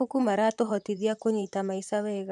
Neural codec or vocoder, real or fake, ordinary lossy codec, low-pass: vocoder, 44.1 kHz, 128 mel bands, Pupu-Vocoder; fake; none; 10.8 kHz